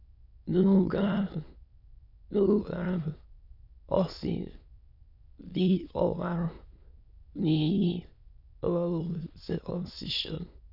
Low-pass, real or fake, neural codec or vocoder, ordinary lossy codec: 5.4 kHz; fake; autoencoder, 22.05 kHz, a latent of 192 numbers a frame, VITS, trained on many speakers; none